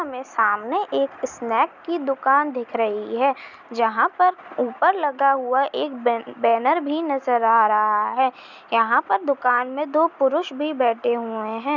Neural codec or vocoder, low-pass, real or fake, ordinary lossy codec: none; 7.2 kHz; real; none